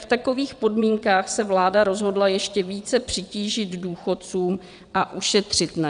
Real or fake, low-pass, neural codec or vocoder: fake; 9.9 kHz; vocoder, 22.05 kHz, 80 mel bands, WaveNeXt